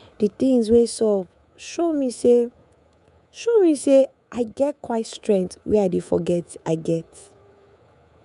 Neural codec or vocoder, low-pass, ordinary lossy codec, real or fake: codec, 24 kHz, 3.1 kbps, DualCodec; 10.8 kHz; none; fake